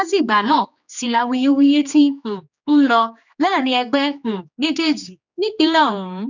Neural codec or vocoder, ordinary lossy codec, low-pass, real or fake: codec, 16 kHz, 2 kbps, X-Codec, HuBERT features, trained on general audio; none; 7.2 kHz; fake